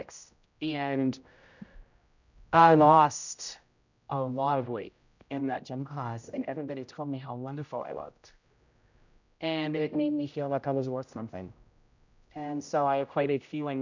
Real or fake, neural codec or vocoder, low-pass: fake; codec, 16 kHz, 0.5 kbps, X-Codec, HuBERT features, trained on general audio; 7.2 kHz